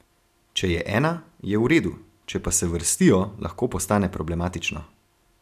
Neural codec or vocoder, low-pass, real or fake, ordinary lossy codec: none; 14.4 kHz; real; none